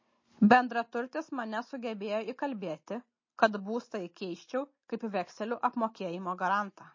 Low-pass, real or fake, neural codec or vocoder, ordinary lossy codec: 7.2 kHz; real; none; MP3, 32 kbps